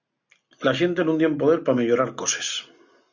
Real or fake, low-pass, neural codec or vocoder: real; 7.2 kHz; none